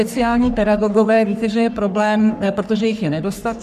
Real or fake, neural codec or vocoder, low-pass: fake; codec, 44.1 kHz, 3.4 kbps, Pupu-Codec; 14.4 kHz